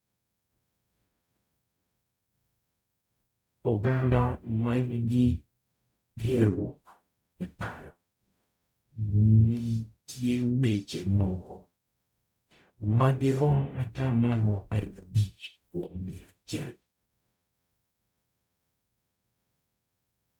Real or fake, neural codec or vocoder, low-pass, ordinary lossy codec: fake; codec, 44.1 kHz, 0.9 kbps, DAC; 19.8 kHz; none